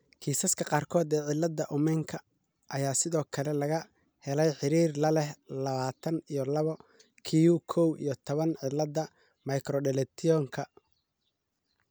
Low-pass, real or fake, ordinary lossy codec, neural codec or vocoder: none; real; none; none